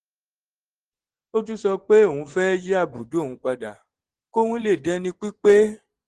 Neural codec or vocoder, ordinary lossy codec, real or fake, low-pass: vocoder, 24 kHz, 100 mel bands, Vocos; Opus, 16 kbps; fake; 10.8 kHz